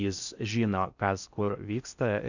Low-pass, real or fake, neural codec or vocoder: 7.2 kHz; fake; codec, 16 kHz in and 24 kHz out, 0.6 kbps, FocalCodec, streaming, 4096 codes